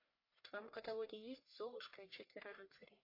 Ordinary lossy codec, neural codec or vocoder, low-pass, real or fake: MP3, 32 kbps; codec, 44.1 kHz, 1.7 kbps, Pupu-Codec; 5.4 kHz; fake